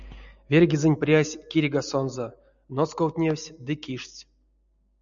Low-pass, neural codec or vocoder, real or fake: 7.2 kHz; none; real